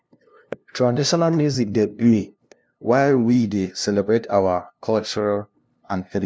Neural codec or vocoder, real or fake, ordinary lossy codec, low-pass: codec, 16 kHz, 0.5 kbps, FunCodec, trained on LibriTTS, 25 frames a second; fake; none; none